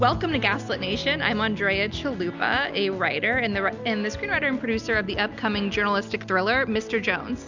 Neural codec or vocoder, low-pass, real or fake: none; 7.2 kHz; real